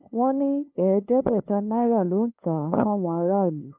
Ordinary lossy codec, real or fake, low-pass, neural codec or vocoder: Opus, 24 kbps; fake; 3.6 kHz; codec, 16 kHz, 2 kbps, FunCodec, trained on LibriTTS, 25 frames a second